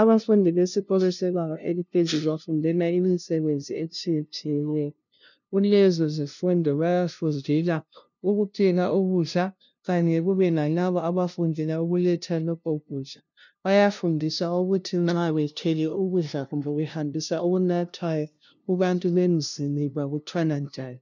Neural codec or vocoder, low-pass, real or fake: codec, 16 kHz, 0.5 kbps, FunCodec, trained on LibriTTS, 25 frames a second; 7.2 kHz; fake